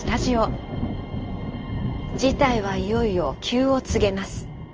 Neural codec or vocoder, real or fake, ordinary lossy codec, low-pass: codec, 16 kHz in and 24 kHz out, 1 kbps, XY-Tokenizer; fake; Opus, 24 kbps; 7.2 kHz